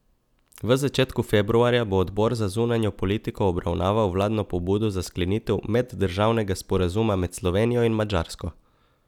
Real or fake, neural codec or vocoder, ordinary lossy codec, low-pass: real; none; none; 19.8 kHz